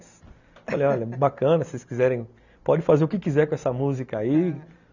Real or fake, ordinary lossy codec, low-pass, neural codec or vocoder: real; none; 7.2 kHz; none